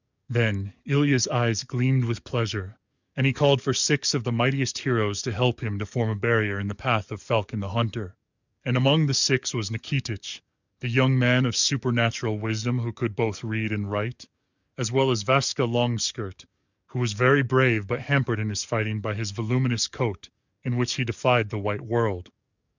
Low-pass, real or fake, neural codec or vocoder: 7.2 kHz; fake; codec, 44.1 kHz, 7.8 kbps, DAC